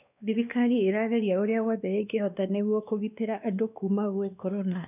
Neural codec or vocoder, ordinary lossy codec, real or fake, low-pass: codec, 16 kHz, 2 kbps, X-Codec, WavLM features, trained on Multilingual LibriSpeech; none; fake; 3.6 kHz